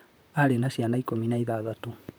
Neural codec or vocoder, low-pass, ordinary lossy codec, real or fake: vocoder, 44.1 kHz, 128 mel bands, Pupu-Vocoder; none; none; fake